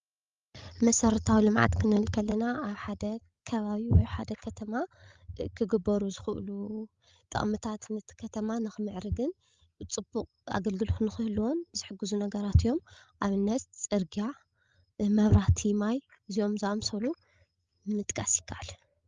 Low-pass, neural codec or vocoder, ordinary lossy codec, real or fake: 7.2 kHz; codec, 16 kHz, 16 kbps, FreqCodec, larger model; Opus, 32 kbps; fake